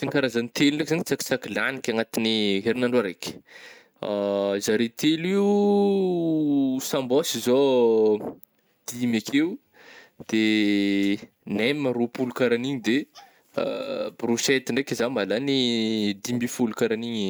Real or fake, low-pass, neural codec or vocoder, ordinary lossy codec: real; none; none; none